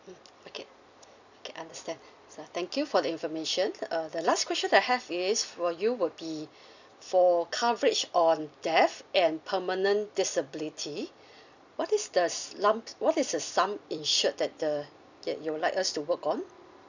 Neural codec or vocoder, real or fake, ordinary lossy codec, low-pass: vocoder, 44.1 kHz, 128 mel bands every 256 samples, BigVGAN v2; fake; none; 7.2 kHz